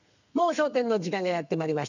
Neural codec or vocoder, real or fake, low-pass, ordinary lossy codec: codec, 32 kHz, 1.9 kbps, SNAC; fake; 7.2 kHz; none